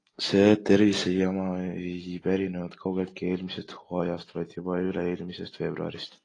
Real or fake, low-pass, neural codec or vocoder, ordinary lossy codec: real; 9.9 kHz; none; AAC, 32 kbps